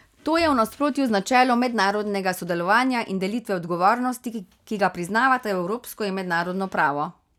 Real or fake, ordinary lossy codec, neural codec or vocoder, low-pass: real; none; none; 19.8 kHz